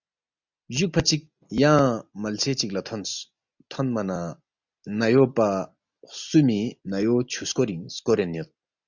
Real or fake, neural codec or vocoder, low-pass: real; none; 7.2 kHz